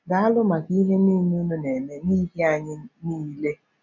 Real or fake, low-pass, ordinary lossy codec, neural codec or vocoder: real; 7.2 kHz; none; none